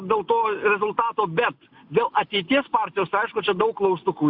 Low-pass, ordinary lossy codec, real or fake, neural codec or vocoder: 5.4 kHz; AAC, 48 kbps; real; none